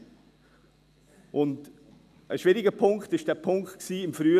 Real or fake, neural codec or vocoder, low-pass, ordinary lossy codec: real; none; 14.4 kHz; none